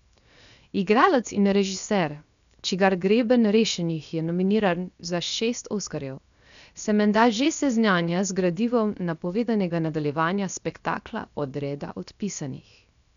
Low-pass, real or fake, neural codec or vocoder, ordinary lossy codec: 7.2 kHz; fake; codec, 16 kHz, 0.7 kbps, FocalCodec; none